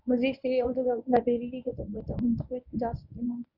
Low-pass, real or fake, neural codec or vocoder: 5.4 kHz; fake; codec, 24 kHz, 0.9 kbps, WavTokenizer, medium speech release version 1